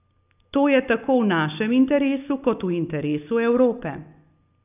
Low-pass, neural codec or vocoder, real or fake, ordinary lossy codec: 3.6 kHz; none; real; none